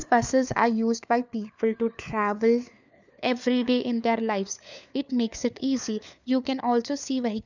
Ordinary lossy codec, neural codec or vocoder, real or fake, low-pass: none; codec, 16 kHz, 4 kbps, FunCodec, trained on LibriTTS, 50 frames a second; fake; 7.2 kHz